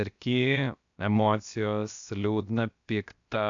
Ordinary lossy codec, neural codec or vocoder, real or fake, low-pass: AAC, 64 kbps; codec, 16 kHz, 0.7 kbps, FocalCodec; fake; 7.2 kHz